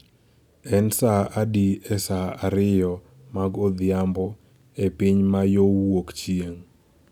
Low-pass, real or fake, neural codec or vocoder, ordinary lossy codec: 19.8 kHz; real; none; none